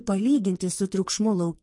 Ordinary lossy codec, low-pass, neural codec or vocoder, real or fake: MP3, 48 kbps; 10.8 kHz; codec, 44.1 kHz, 2.6 kbps, SNAC; fake